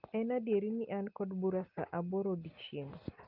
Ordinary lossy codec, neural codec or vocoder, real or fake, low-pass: Opus, 24 kbps; none; real; 5.4 kHz